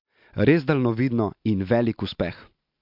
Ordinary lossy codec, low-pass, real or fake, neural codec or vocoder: MP3, 48 kbps; 5.4 kHz; real; none